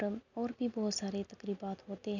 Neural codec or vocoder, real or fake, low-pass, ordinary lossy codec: none; real; 7.2 kHz; none